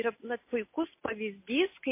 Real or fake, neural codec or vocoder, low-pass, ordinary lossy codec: real; none; 3.6 kHz; MP3, 24 kbps